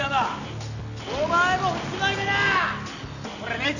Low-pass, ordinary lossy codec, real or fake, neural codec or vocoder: 7.2 kHz; none; real; none